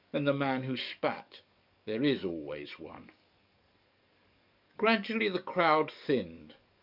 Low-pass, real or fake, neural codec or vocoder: 5.4 kHz; fake; codec, 44.1 kHz, 7.8 kbps, DAC